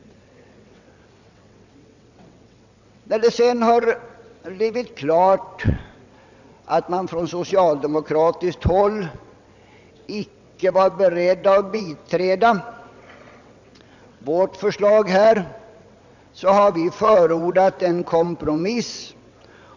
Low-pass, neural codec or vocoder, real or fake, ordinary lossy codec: 7.2 kHz; vocoder, 22.05 kHz, 80 mel bands, WaveNeXt; fake; none